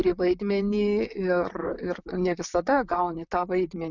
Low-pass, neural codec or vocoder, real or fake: 7.2 kHz; vocoder, 44.1 kHz, 128 mel bands, Pupu-Vocoder; fake